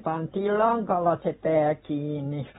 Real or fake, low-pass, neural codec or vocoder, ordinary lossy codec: fake; 19.8 kHz; vocoder, 44.1 kHz, 128 mel bands every 512 samples, BigVGAN v2; AAC, 16 kbps